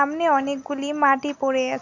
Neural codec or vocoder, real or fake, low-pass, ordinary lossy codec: none; real; 7.2 kHz; Opus, 64 kbps